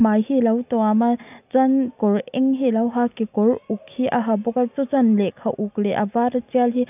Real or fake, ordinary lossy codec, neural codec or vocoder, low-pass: real; none; none; 3.6 kHz